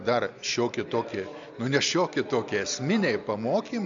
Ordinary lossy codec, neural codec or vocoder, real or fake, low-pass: AAC, 64 kbps; none; real; 7.2 kHz